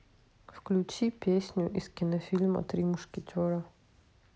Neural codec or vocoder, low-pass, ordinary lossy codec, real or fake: none; none; none; real